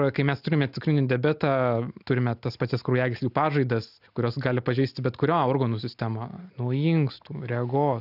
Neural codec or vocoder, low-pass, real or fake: none; 5.4 kHz; real